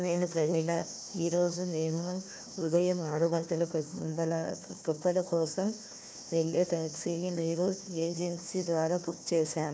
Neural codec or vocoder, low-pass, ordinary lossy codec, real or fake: codec, 16 kHz, 1 kbps, FunCodec, trained on Chinese and English, 50 frames a second; none; none; fake